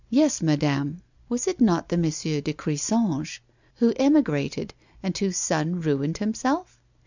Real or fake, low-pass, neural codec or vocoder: real; 7.2 kHz; none